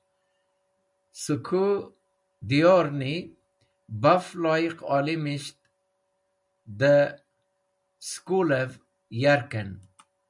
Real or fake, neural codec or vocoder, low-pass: real; none; 10.8 kHz